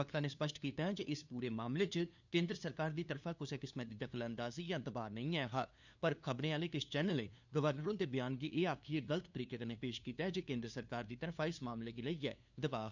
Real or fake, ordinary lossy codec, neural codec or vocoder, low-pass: fake; none; codec, 16 kHz, 2 kbps, FunCodec, trained on LibriTTS, 25 frames a second; 7.2 kHz